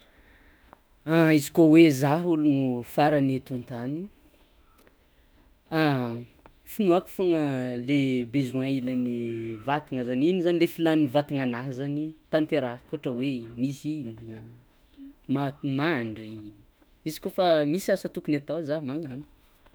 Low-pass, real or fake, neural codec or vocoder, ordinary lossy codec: none; fake; autoencoder, 48 kHz, 32 numbers a frame, DAC-VAE, trained on Japanese speech; none